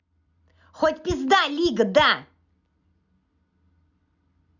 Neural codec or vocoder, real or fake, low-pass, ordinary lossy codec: none; real; 7.2 kHz; none